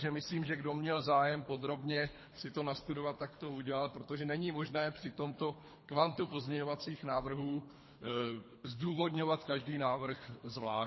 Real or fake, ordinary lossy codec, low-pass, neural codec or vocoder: fake; MP3, 24 kbps; 7.2 kHz; codec, 24 kHz, 3 kbps, HILCodec